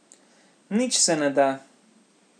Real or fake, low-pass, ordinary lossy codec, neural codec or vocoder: real; 9.9 kHz; none; none